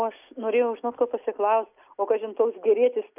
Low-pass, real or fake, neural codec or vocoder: 3.6 kHz; real; none